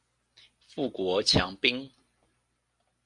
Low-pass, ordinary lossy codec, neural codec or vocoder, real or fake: 10.8 kHz; MP3, 48 kbps; none; real